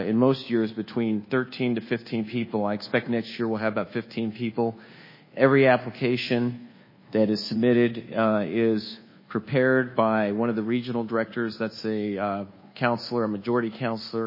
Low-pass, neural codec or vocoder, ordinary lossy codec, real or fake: 5.4 kHz; codec, 24 kHz, 1.2 kbps, DualCodec; MP3, 24 kbps; fake